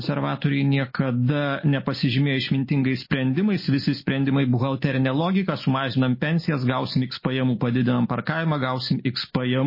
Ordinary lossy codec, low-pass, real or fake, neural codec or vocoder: MP3, 24 kbps; 5.4 kHz; real; none